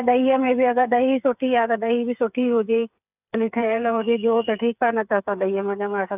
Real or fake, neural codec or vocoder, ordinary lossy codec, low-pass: fake; codec, 16 kHz, 8 kbps, FreqCodec, smaller model; none; 3.6 kHz